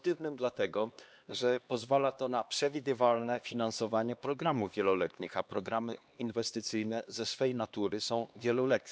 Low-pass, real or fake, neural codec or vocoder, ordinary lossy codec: none; fake; codec, 16 kHz, 2 kbps, X-Codec, HuBERT features, trained on LibriSpeech; none